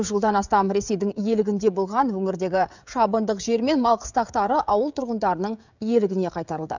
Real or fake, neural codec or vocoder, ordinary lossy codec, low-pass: fake; codec, 16 kHz, 16 kbps, FreqCodec, smaller model; none; 7.2 kHz